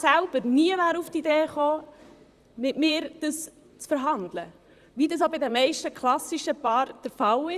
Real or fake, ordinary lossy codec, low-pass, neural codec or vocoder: fake; Opus, 64 kbps; 14.4 kHz; vocoder, 44.1 kHz, 128 mel bands, Pupu-Vocoder